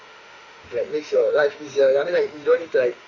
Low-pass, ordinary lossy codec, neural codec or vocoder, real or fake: 7.2 kHz; none; autoencoder, 48 kHz, 32 numbers a frame, DAC-VAE, trained on Japanese speech; fake